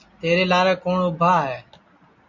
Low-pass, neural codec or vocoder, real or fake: 7.2 kHz; none; real